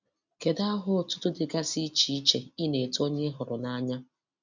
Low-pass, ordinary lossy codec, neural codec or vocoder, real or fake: 7.2 kHz; none; none; real